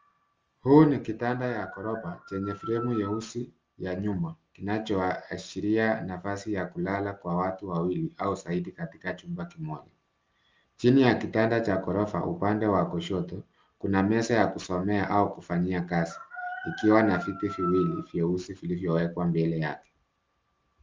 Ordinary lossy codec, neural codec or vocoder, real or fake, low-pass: Opus, 24 kbps; none; real; 7.2 kHz